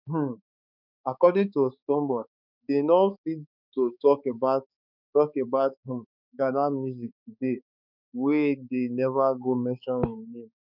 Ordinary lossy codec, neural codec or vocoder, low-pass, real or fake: none; codec, 16 kHz, 4 kbps, X-Codec, HuBERT features, trained on balanced general audio; 5.4 kHz; fake